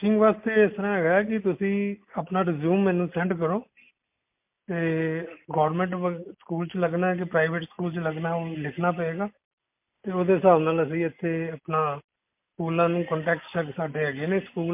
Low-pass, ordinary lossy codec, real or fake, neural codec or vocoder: 3.6 kHz; MP3, 32 kbps; real; none